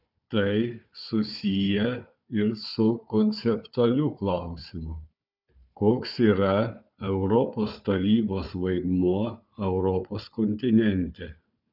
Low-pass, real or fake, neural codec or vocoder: 5.4 kHz; fake; codec, 16 kHz, 4 kbps, FunCodec, trained on Chinese and English, 50 frames a second